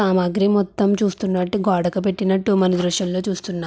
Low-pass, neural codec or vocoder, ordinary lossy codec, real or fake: none; none; none; real